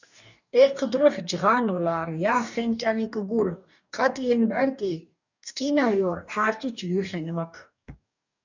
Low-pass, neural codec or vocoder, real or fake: 7.2 kHz; codec, 44.1 kHz, 2.6 kbps, DAC; fake